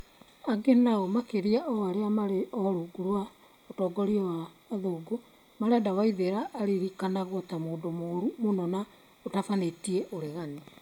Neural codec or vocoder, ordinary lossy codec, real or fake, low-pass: none; none; real; 19.8 kHz